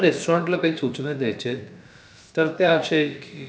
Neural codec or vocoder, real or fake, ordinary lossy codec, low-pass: codec, 16 kHz, about 1 kbps, DyCAST, with the encoder's durations; fake; none; none